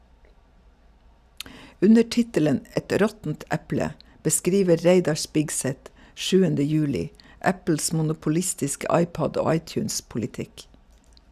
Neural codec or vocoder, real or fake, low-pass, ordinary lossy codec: none; real; 14.4 kHz; none